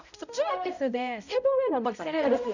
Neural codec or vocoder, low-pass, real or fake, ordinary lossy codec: codec, 16 kHz, 0.5 kbps, X-Codec, HuBERT features, trained on balanced general audio; 7.2 kHz; fake; none